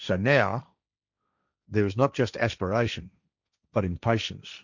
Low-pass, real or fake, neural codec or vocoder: 7.2 kHz; fake; codec, 16 kHz, 1.1 kbps, Voila-Tokenizer